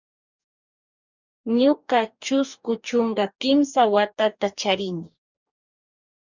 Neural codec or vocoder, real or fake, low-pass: codec, 44.1 kHz, 2.6 kbps, DAC; fake; 7.2 kHz